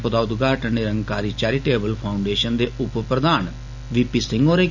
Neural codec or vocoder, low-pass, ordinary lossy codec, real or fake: none; 7.2 kHz; none; real